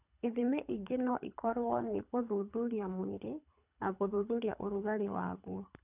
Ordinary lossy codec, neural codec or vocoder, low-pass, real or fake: none; codec, 24 kHz, 3 kbps, HILCodec; 3.6 kHz; fake